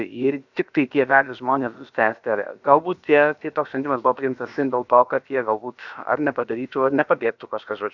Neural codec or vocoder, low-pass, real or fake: codec, 16 kHz, about 1 kbps, DyCAST, with the encoder's durations; 7.2 kHz; fake